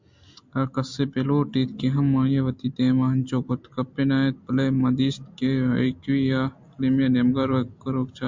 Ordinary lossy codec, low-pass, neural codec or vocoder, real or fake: MP3, 64 kbps; 7.2 kHz; none; real